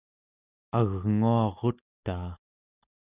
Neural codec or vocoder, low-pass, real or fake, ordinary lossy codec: none; 3.6 kHz; real; Opus, 64 kbps